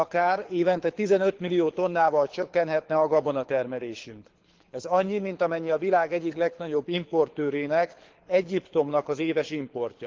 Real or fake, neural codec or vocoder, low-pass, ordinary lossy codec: fake; codec, 24 kHz, 6 kbps, HILCodec; 7.2 kHz; Opus, 16 kbps